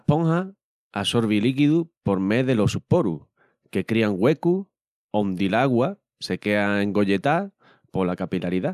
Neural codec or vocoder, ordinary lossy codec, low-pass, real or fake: none; none; 14.4 kHz; real